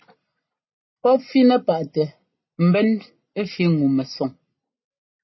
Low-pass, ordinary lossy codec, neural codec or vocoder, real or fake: 7.2 kHz; MP3, 24 kbps; none; real